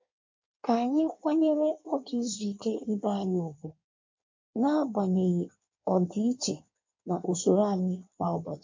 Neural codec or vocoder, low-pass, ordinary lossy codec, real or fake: codec, 16 kHz in and 24 kHz out, 1.1 kbps, FireRedTTS-2 codec; 7.2 kHz; MP3, 48 kbps; fake